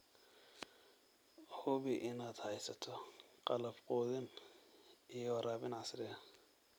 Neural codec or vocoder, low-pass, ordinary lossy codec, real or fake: none; none; none; real